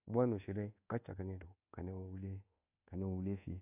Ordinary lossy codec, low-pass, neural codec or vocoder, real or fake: AAC, 32 kbps; 3.6 kHz; codec, 24 kHz, 1.2 kbps, DualCodec; fake